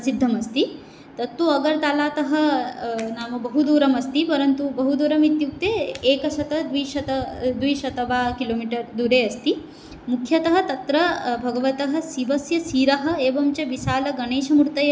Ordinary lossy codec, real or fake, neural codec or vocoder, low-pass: none; real; none; none